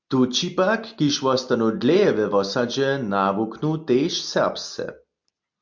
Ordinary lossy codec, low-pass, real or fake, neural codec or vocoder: MP3, 64 kbps; 7.2 kHz; real; none